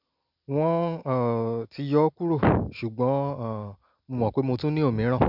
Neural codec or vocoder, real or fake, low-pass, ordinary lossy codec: none; real; 5.4 kHz; none